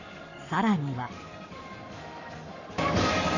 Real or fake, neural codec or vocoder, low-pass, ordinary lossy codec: fake; codec, 44.1 kHz, 7.8 kbps, Pupu-Codec; 7.2 kHz; none